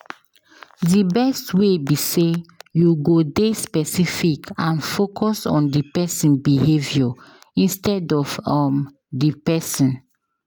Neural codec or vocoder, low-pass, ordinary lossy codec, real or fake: none; none; none; real